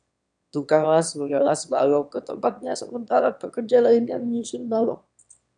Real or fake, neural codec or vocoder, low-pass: fake; autoencoder, 22.05 kHz, a latent of 192 numbers a frame, VITS, trained on one speaker; 9.9 kHz